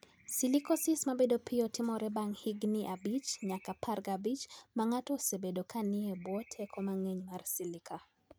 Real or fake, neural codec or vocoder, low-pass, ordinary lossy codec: real; none; none; none